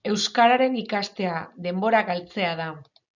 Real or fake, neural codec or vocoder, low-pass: real; none; 7.2 kHz